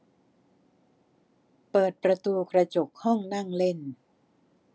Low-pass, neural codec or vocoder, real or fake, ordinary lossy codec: none; none; real; none